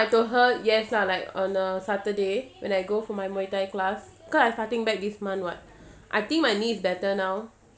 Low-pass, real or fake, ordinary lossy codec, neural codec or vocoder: none; real; none; none